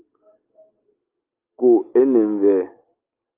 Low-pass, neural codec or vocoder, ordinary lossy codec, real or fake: 3.6 kHz; none; Opus, 32 kbps; real